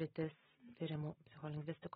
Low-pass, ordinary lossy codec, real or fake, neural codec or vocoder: 10.8 kHz; AAC, 16 kbps; real; none